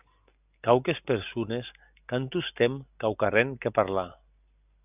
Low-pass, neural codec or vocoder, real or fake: 3.6 kHz; none; real